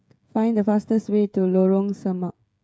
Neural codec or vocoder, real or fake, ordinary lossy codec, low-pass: codec, 16 kHz, 16 kbps, FreqCodec, smaller model; fake; none; none